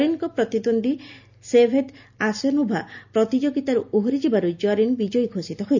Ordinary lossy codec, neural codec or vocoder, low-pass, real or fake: none; none; 7.2 kHz; real